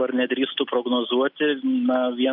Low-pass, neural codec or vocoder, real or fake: 5.4 kHz; none; real